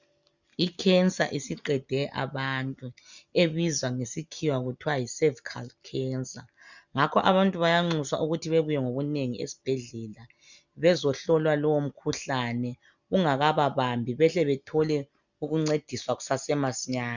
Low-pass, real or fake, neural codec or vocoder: 7.2 kHz; real; none